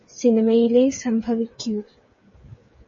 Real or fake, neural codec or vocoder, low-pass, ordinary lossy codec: fake; codec, 16 kHz, 8 kbps, FreqCodec, smaller model; 7.2 kHz; MP3, 32 kbps